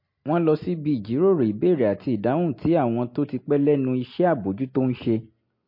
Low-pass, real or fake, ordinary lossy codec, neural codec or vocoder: 5.4 kHz; real; MP3, 32 kbps; none